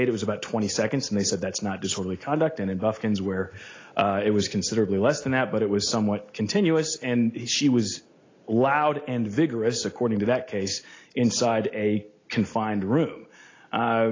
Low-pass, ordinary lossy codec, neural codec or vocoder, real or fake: 7.2 kHz; AAC, 32 kbps; none; real